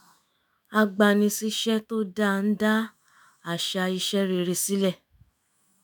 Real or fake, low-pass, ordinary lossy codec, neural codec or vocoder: fake; none; none; autoencoder, 48 kHz, 128 numbers a frame, DAC-VAE, trained on Japanese speech